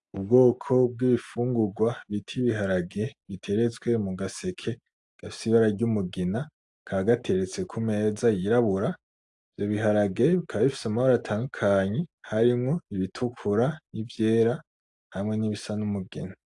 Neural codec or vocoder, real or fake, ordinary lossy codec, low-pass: none; real; AAC, 64 kbps; 10.8 kHz